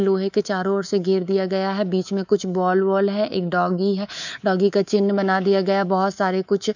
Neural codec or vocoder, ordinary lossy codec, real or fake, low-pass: codec, 24 kHz, 3.1 kbps, DualCodec; none; fake; 7.2 kHz